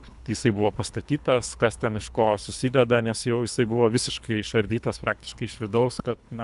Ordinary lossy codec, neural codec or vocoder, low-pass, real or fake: AAC, 96 kbps; codec, 24 kHz, 3 kbps, HILCodec; 10.8 kHz; fake